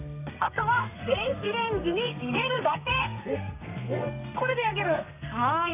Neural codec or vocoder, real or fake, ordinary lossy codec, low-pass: codec, 32 kHz, 1.9 kbps, SNAC; fake; none; 3.6 kHz